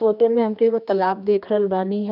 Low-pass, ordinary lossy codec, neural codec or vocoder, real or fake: 5.4 kHz; none; codec, 16 kHz, 2 kbps, X-Codec, HuBERT features, trained on general audio; fake